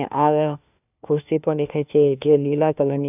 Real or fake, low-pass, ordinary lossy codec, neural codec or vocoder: fake; 3.6 kHz; none; codec, 16 kHz, 1 kbps, FunCodec, trained on LibriTTS, 50 frames a second